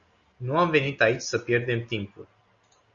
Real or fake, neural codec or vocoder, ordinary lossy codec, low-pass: real; none; Opus, 64 kbps; 7.2 kHz